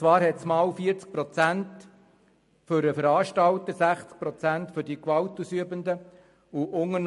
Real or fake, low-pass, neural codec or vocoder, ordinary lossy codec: real; 14.4 kHz; none; MP3, 48 kbps